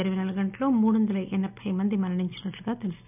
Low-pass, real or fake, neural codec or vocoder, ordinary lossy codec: 3.6 kHz; real; none; none